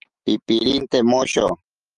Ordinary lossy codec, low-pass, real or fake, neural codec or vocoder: Opus, 32 kbps; 10.8 kHz; fake; autoencoder, 48 kHz, 128 numbers a frame, DAC-VAE, trained on Japanese speech